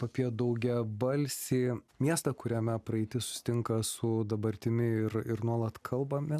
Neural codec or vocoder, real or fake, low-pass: none; real; 14.4 kHz